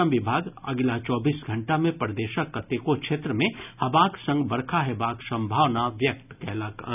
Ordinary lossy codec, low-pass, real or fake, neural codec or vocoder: none; 3.6 kHz; real; none